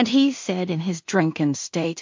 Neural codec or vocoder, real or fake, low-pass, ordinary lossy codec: codec, 16 kHz in and 24 kHz out, 0.4 kbps, LongCat-Audio-Codec, two codebook decoder; fake; 7.2 kHz; MP3, 48 kbps